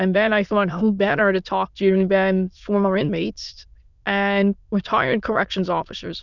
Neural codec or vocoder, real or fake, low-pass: autoencoder, 22.05 kHz, a latent of 192 numbers a frame, VITS, trained on many speakers; fake; 7.2 kHz